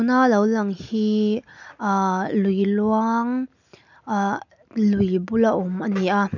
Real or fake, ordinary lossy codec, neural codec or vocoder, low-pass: real; none; none; 7.2 kHz